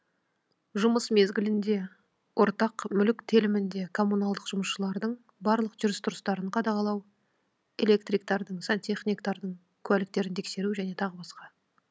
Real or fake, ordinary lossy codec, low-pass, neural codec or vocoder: real; none; none; none